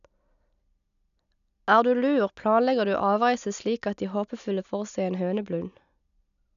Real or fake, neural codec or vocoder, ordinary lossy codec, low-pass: real; none; none; 7.2 kHz